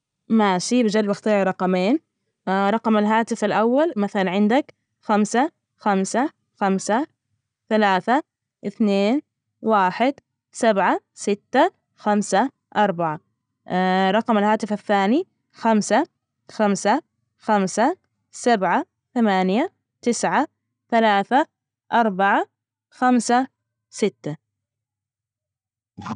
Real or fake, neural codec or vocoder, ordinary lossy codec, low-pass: real; none; none; 9.9 kHz